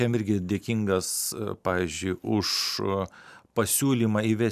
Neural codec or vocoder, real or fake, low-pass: none; real; 14.4 kHz